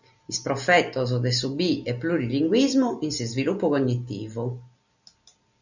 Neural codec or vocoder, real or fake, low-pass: none; real; 7.2 kHz